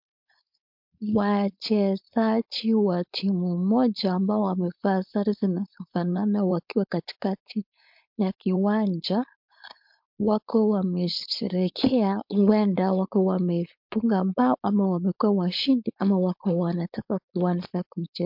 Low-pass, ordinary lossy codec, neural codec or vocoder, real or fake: 5.4 kHz; MP3, 48 kbps; codec, 16 kHz, 4.8 kbps, FACodec; fake